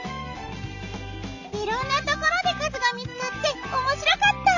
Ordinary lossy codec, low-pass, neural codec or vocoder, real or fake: none; 7.2 kHz; none; real